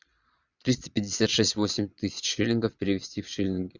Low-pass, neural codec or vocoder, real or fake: 7.2 kHz; vocoder, 22.05 kHz, 80 mel bands, Vocos; fake